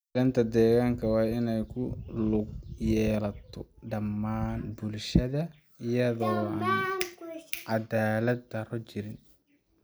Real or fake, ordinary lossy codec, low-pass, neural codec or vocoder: real; none; none; none